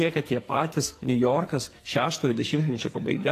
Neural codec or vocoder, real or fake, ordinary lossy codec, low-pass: codec, 44.1 kHz, 2.6 kbps, SNAC; fake; AAC, 48 kbps; 14.4 kHz